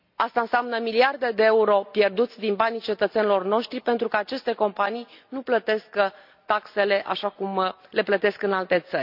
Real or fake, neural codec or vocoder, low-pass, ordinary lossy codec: real; none; 5.4 kHz; none